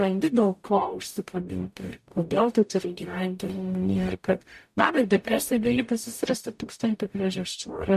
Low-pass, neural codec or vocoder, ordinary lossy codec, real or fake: 14.4 kHz; codec, 44.1 kHz, 0.9 kbps, DAC; MP3, 64 kbps; fake